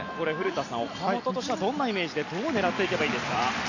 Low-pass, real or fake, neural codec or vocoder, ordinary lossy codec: 7.2 kHz; real; none; none